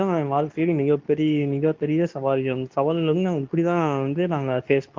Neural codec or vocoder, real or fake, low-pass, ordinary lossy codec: codec, 24 kHz, 0.9 kbps, WavTokenizer, medium speech release version 2; fake; 7.2 kHz; Opus, 24 kbps